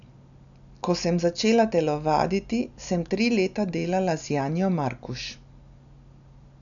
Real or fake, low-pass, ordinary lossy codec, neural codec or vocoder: real; 7.2 kHz; none; none